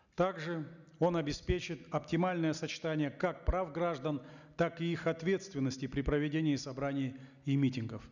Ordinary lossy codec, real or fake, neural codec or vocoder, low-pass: none; real; none; 7.2 kHz